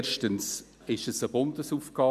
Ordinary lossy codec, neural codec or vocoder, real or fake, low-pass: none; none; real; 14.4 kHz